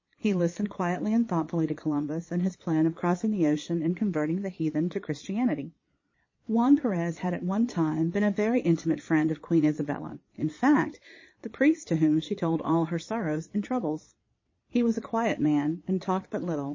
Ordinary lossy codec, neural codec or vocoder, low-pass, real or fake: MP3, 32 kbps; vocoder, 22.05 kHz, 80 mel bands, Vocos; 7.2 kHz; fake